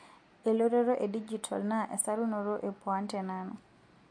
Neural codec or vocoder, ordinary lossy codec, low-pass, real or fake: none; MP3, 48 kbps; 9.9 kHz; real